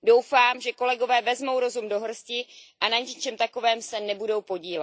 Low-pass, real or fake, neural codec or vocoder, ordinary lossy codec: none; real; none; none